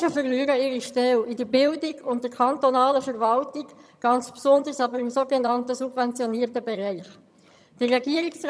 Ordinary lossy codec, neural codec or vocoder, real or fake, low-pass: none; vocoder, 22.05 kHz, 80 mel bands, HiFi-GAN; fake; none